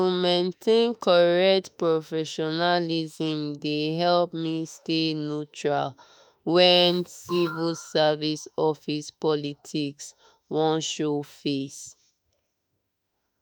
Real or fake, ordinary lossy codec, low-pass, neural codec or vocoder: fake; none; none; autoencoder, 48 kHz, 32 numbers a frame, DAC-VAE, trained on Japanese speech